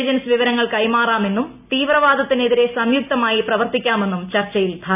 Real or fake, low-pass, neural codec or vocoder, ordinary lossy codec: real; 3.6 kHz; none; none